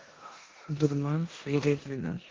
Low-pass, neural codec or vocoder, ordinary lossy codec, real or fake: 7.2 kHz; codec, 16 kHz in and 24 kHz out, 0.9 kbps, LongCat-Audio-Codec, four codebook decoder; Opus, 16 kbps; fake